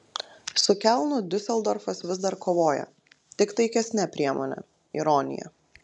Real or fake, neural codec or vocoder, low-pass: real; none; 10.8 kHz